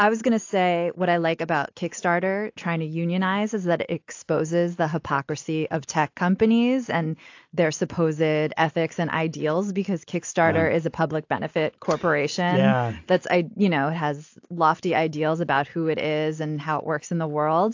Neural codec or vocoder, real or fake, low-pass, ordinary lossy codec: none; real; 7.2 kHz; AAC, 48 kbps